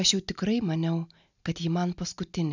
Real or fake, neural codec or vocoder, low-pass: real; none; 7.2 kHz